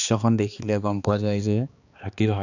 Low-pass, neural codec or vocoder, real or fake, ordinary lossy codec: 7.2 kHz; codec, 16 kHz, 2 kbps, X-Codec, HuBERT features, trained on balanced general audio; fake; none